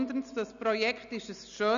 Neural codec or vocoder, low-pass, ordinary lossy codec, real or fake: none; 7.2 kHz; none; real